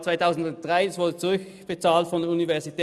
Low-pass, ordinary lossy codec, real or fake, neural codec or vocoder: none; none; real; none